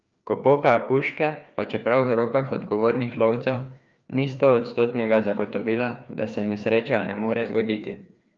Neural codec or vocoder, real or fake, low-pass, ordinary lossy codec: codec, 16 kHz, 2 kbps, FreqCodec, larger model; fake; 7.2 kHz; Opus, 24 kbps